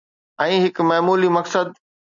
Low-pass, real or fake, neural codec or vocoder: 7.2 kHz; real; none